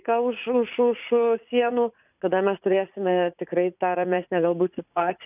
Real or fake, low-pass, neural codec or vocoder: fake; 3.6 kHz; vocoder, 44.1 kHz, 80 mel bands, Vocos